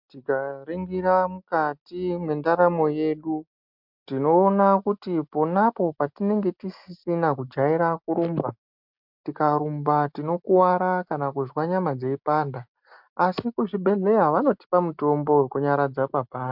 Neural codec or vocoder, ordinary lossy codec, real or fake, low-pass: none; MP3, 48 kbps; real; 5.4 kHz